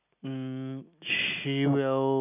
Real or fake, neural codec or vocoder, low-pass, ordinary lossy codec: real; none; 3.6 kHz; none